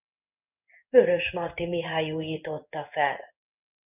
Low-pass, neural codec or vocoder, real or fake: 3.6 kHz; codec, 16 kHz in and 24 kHz out, 1 kbps, XY-Tokenizer; fake